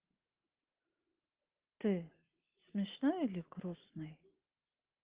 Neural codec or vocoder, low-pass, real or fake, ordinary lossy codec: none; 3.6 kHz; real; Opus, 16 kbps